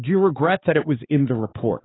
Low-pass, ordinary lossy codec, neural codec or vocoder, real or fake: 7.2 kHz; AAC, 16 kbps; codec, 16 kHz, 4 kbps, X-Codec, HuBERT features, trained on balanced general audio; fake